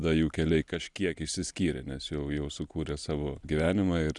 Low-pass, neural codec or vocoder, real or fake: 10.8 kHz; none; real